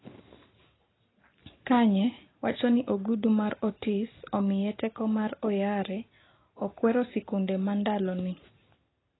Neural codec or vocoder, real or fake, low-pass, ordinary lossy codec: none; real; 7.2 kHz; AAC, 16 kbps